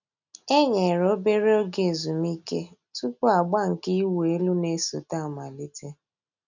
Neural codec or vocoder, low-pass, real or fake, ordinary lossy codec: none; 7.2 kHz; real; none